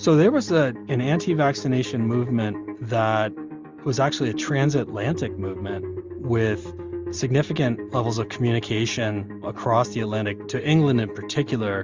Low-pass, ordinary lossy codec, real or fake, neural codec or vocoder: 7.2 kHz; Opus, 32 kbps; real; none